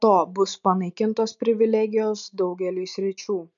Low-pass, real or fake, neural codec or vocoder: 7.2 kHz; real; none